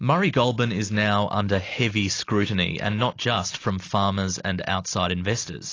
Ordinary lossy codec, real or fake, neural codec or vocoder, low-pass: AAC, 32 kbps; real; none; 7.2 kHz